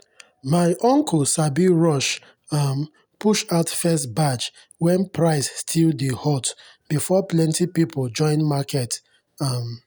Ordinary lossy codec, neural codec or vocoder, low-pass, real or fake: none; none; none; real